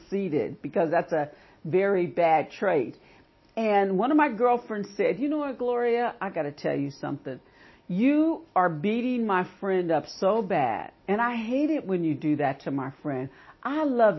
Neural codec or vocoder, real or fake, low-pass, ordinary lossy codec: none; real; 7.2 kHz; MP3, 24 kbps